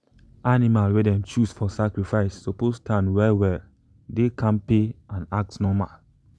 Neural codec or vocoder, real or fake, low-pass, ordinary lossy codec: none; real; none; none